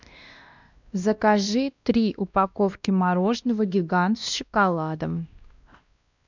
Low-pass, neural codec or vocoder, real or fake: 7.2 kHz; codec, 16 kHz, 1 kbps, X-Codec, WavLM features, trained on Multilingual LibriSpeech; fake